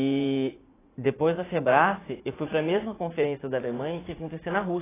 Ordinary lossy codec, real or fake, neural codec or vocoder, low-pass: AAC, 16 kbps; fake; vocoder, 44.1 kHz, 80 mel bands, Vocos; 3.6 kHz